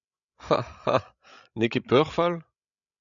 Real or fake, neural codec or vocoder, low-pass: fake; codec, 16 kHz, 16 kbps, FreqCodec, larger model; 7.2 kHz